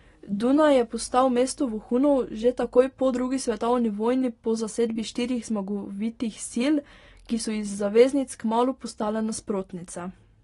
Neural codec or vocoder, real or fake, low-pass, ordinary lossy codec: none; real; 10.8 kHz; AAC, 32 kbps